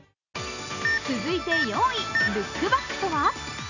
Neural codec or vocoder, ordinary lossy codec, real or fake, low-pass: none; none; real; 7.2 kHz